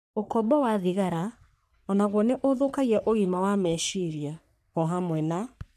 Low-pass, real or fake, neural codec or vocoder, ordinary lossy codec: 14.4 kHz; fake; codec, 44.1 kHz, 3.4 kbps, Pupu-Codec; none